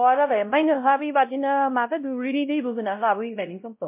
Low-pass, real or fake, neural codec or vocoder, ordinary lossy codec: 3.6 kHz; fake; codec, 16 kHz, 0.5 kbps, X-Codec, WavLM features, trained on Multilingual LibriSpeech; MP3, 32 kbps